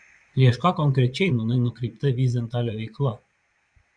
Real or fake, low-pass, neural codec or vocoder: fake; 9.9 kHz; vocoder, 22.05 kHz, 80 mel bands, Vocos